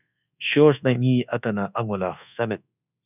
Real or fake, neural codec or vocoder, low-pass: fake; codec, 24 kHz, 1.2 kbps, DualCodec; 3.6 kHz